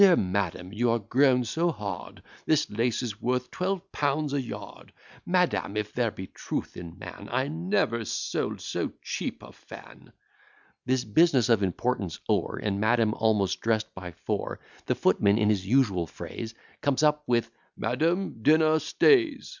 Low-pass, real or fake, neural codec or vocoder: 7.2 kHz; real; none